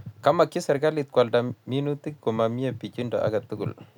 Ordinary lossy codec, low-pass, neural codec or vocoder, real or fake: none; 19.8 kHz; none; real